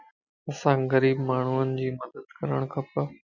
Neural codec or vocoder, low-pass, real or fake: none; 7.2 kHz; real